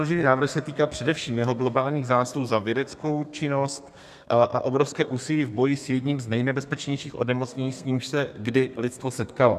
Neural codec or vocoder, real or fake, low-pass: codec, 32 kHz, 1.9 kbps, SNAC; fake; 14.4 kHz